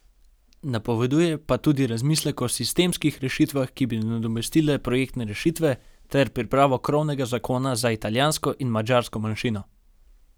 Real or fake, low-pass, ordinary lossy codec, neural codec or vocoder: real; none; none; none